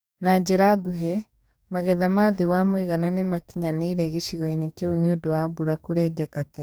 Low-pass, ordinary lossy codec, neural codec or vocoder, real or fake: none; none; codec, 44.1 kHz, 2.6 kbps, DAC; fake